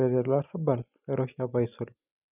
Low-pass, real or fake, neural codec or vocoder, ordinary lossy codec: 3.6 kHz; real; none; none